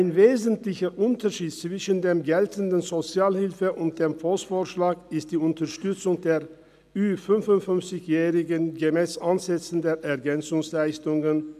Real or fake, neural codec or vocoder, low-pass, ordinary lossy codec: real; none; 14.4 kHz; none